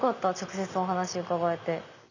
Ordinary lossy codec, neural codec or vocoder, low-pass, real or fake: none; none; 7.2 kHz; real